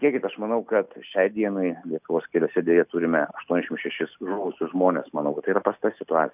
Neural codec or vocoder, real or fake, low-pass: none; real; 3.6 kHz